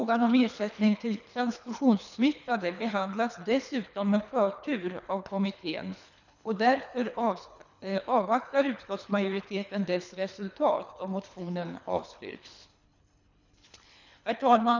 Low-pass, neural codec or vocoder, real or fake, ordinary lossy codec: 7.2 kHz; codec, 24 kHz, 3 kbps, HILCodec; fake; none